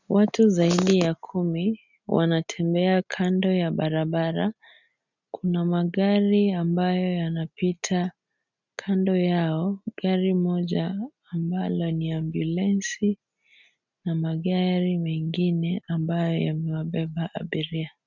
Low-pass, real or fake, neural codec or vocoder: 7.2 kHz; real; none